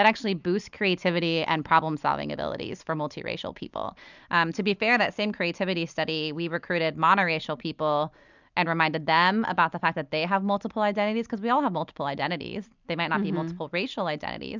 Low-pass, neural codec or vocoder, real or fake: 7.2 kHz; none; real